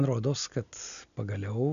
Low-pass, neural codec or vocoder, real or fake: 7.2 kHz; none; real